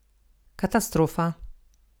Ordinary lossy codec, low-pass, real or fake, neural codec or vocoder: none; none; real; none